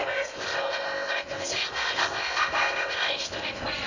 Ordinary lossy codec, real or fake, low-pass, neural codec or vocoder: none; fake; 7.2 kHz; codec, 16 kHz in and 24 kHz out, 0.6 kbps, FocalCodec, streaming, 2048 codes